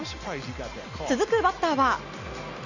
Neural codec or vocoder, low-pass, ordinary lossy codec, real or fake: none; 7.2 kHz; none; real